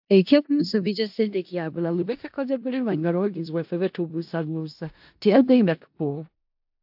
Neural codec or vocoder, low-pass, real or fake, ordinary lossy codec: codec, 16 kHz in and 24 kHz out, 0.4 kbps, LongCat-Audio-Codec, four codebook decoder; 5.4 kHz; fake; AAC, 48 kbps